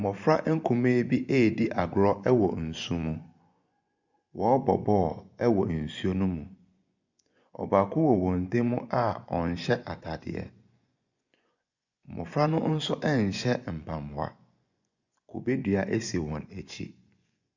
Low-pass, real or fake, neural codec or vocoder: 7.2 kHz; fake; vocoder, 24 kHz, 100 mel bands, Vocos